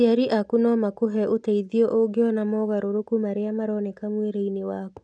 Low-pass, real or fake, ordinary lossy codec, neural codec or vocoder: 9.9 kHz; real; none; none